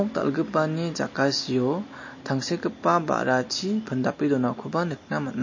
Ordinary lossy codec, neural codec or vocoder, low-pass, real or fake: MP3, 32 kbps; none; 7.2 kHz; real